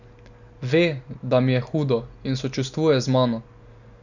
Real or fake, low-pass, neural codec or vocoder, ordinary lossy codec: real; 7.2 kHz; none; none